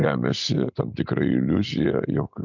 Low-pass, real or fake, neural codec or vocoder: 7.2 kHz; real; none